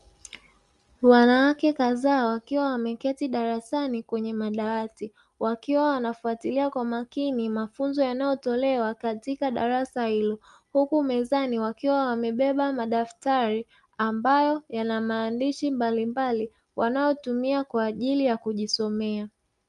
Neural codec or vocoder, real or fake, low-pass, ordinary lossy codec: none; real; 10.8 kHz; Opus, 32 kbps